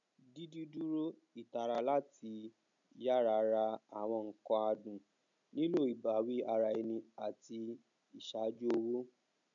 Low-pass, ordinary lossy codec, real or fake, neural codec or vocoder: 7.2 kHz; none; real; none